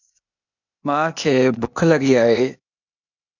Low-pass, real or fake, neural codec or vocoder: 7.2 kHz; fake; codec, 16 kHz, 0.8 kbps, ZipCodec